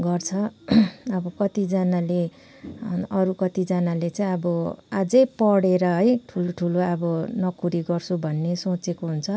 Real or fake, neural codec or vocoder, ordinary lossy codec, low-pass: real; none; none; none